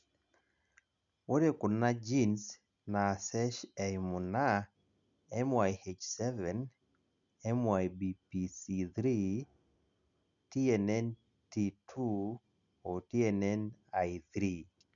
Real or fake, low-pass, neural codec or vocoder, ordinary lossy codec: real; 7.2 kHz; none; none